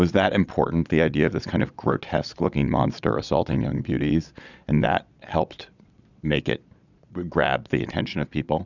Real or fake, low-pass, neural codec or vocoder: fake; 7.2 kHz; vocoder, 22.05 kHz, 80 mel bands, Vocos